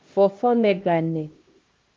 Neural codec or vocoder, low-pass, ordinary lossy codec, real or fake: codec, 16 kHz, 1 kbps, X-Codec, HuBERT features, trained on LibriSpeech; 7.2 kHz; Opus, 24 kbps; fake